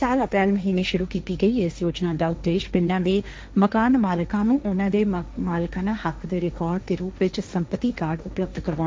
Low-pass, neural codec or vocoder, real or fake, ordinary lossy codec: none; codec, 16 kHz, 1.1 kbps, Voila-Tokenizer; fake; none